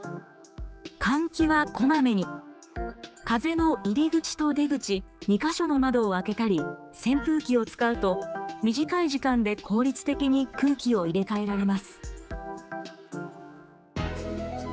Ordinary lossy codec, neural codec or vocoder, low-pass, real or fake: none; codec, 16 kHz, 4 kbps, X-Codec, HuBERT features, trained on general audio; none; fake